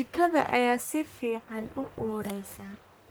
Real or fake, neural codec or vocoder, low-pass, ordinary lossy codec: fake; codec, 44.1 kHz, 1.7 kbps, Pupu-Codec; none; none